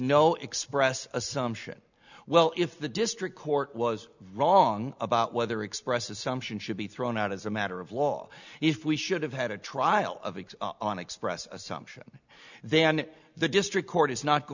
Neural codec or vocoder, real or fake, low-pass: none; real; 7.2 kHz